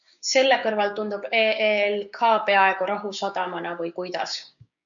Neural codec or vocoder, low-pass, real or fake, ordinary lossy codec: codec, 16 kHz, 6 kbps, DAC; 7.2 kHz; fake; MP3, 96 kbps